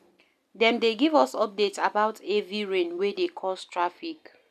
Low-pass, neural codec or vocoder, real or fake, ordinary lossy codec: 14.4 kHz; none; real; none